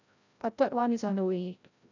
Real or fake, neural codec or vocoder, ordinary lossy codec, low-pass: fake; codec, 16 kHz, 0.5 kbps, FreqCodec, larger model; none; 7.2 kHz